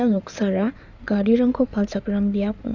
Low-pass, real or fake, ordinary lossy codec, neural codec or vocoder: 7.2 kHz; fake; none; codec, 16 kHz in and 24 kHz out, 2.2 kbps, FireRedTTS-2 codec